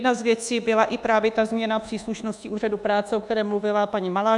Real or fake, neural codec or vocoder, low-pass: fake; codec, 24 kHz, 1.2 kbps, DualCodec; 10.8 kHz